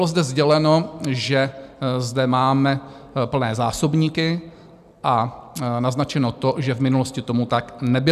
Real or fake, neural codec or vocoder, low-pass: real; none; 14.4 kHz